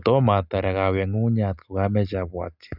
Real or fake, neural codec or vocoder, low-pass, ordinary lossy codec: real; none; 5.4 kHz; none